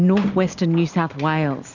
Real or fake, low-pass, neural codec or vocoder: real; 7.2 kHz; none